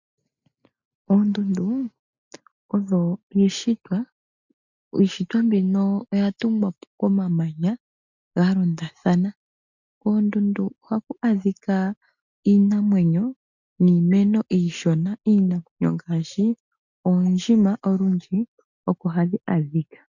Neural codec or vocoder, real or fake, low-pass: none; real; 7.2 kHz